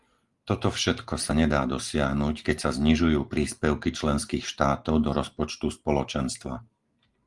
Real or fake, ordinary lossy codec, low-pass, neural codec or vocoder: real; Opus, 24 kbps; 10.8 kHz; none